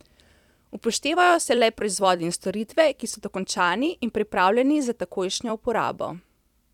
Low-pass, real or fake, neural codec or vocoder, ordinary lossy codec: 19.8 kHz; fake; vocoder, 44.1 kHz, 128 mel bands every 256 samples, BigVGAN v2; none